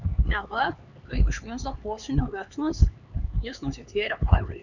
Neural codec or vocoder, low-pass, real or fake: codec, 16 kHz, 4 kbps, X-Codec, HuBERT features, trained on LibriSpeech; 7.2 kHz; fake